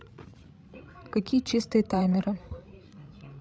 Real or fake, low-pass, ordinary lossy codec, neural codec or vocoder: fake; none; none; codec, 16 kHz, 16 kbps, FreqCodec, larger model